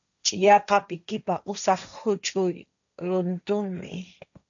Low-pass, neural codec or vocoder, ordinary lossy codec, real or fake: 7.2 kHz; codec, 16 kHz, 1.1 kbps, Voila-Tokenizer; MP3, 96 kbps; fake